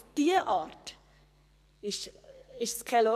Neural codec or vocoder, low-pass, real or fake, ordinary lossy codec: codec, 44.1 kHz, 2.6 kbps, SNAC; 14.4 kHz; fake; none